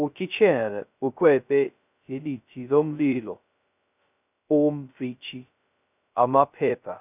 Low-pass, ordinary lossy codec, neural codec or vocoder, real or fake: 3.6 kHz; none; codec, 16 kHz, 0.2 kbps, FocalCodec; fake